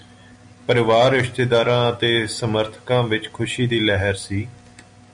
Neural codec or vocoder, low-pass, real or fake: none; 9.9 kHz; real